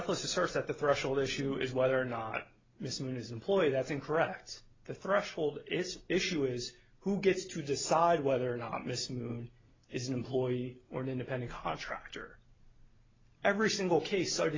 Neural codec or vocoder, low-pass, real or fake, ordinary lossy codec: none; 7.2 kHz; real; AAC, 32 kbps